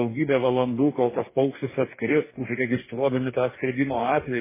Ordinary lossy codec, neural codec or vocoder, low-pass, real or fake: MP3, 16 kbps; codec, 44.1 kHz, 2.6 kbps, DAC; 3.6 kHz; fake